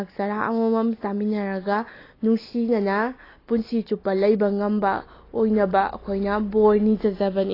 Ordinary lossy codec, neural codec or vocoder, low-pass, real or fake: AAC, 24 kbps; none; 5.4 kHz; real